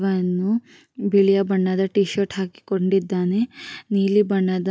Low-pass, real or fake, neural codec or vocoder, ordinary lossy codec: none; real; none; none